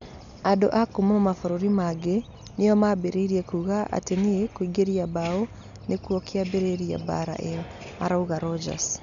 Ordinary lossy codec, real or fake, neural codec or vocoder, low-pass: none; real; none; 7.2 kHz